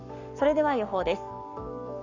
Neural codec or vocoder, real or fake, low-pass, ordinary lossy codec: codec, 44.1 kHz, 7.8 kbps, Pupu-Codec; fake; 7.2 kHz; none